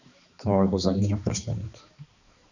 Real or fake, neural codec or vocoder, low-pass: fake; codec, 16 kHz, 2 kbps, X-Codec, HuBERT features, trained on balanced general audio; 7.2 kHz